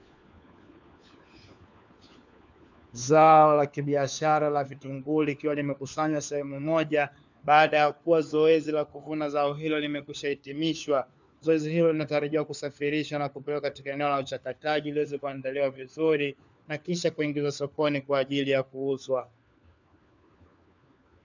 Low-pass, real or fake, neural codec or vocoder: 7.2 kHz; fake; codec, 16 kHz, 4 kbps, FunCodec, trained on LibriTTS, 50 frames a second